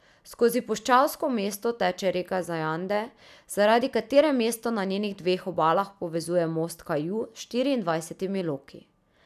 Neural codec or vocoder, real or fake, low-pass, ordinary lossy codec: none; real; 14.4 kHz; none